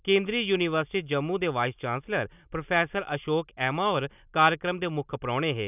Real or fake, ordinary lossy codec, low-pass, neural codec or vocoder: real; none; 3.6 kHz; none